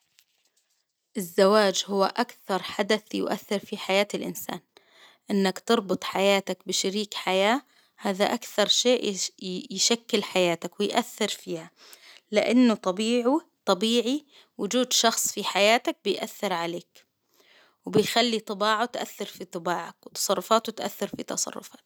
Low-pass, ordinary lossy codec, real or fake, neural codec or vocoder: none; none; real; none